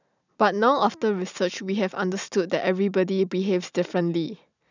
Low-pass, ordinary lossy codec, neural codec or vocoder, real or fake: 7.2 kHz; none; none; real